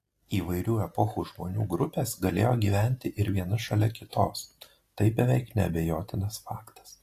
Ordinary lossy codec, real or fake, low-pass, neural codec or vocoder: AAC, 48 kbps; real; 14.4 kHz; none